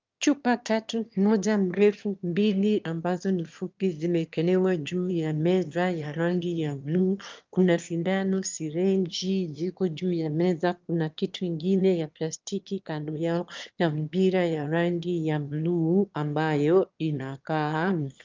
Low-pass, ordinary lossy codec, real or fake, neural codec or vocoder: 7.2 kHz; Opus, 24 kbps; fake; autoencoder, 22.05 kHz, a latent of 192 numbers a frame, VITS, trained on one speaker